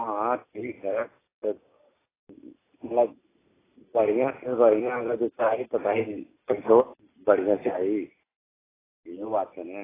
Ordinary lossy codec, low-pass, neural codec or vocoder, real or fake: AAC, 16 kbps; 3.6 kHz; none; real